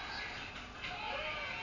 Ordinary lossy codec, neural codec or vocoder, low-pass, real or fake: none; none; 7.2 kHz; real